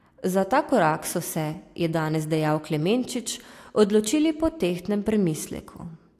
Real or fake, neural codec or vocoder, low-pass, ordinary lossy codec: real; none; 14.4 kHz; AAC, 64 kbps